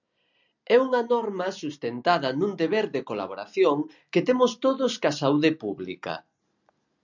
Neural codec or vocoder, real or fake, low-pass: none; real; 7.2 kHz